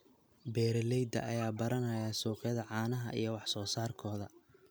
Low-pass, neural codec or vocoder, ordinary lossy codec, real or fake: none; none; none; real